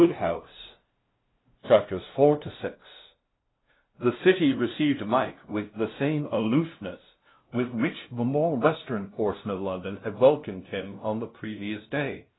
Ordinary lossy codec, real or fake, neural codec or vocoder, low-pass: AAC, 16 kbps; fake; codec, 16 kHz, 0.5 kbps, FunCodec, trained on LibriTTS, 25 frames a second; 7.2 kHz